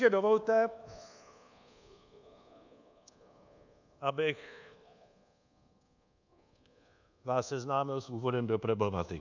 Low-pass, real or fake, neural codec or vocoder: 7.2 kHz; fake; codec, 24 kHz, 1.2 kbps, DualCodec